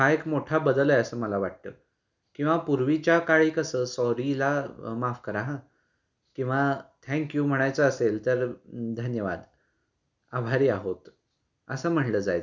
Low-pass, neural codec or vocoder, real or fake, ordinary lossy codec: 7.2 kHz; none; real; none